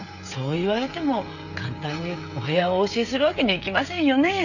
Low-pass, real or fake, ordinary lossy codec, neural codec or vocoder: 7.2 kHz; fake; none; codec, 16 kHz, 16 kbps, FreqCodec, smaller model